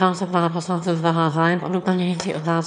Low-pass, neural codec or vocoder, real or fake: 9.9 kHz; autoencoder, 22.05 kHz, a latent of 192 numbers a frame, VITS, trained on one speaker; fake